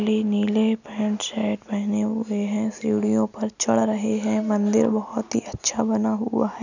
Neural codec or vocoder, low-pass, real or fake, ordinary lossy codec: none; 7.2 kHz; real; none